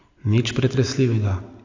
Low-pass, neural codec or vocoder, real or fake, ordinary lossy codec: 7.2 kHz; none; real; AAC, 48 kbps